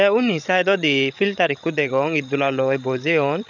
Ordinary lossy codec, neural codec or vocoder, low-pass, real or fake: none; codec, 16 kHz, 16 kbps, FreqCodec, larger model; 7.2 kHz; fake